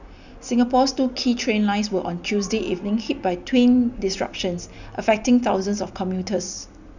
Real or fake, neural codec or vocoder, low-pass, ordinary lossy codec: real; none; 7.2 kHz; none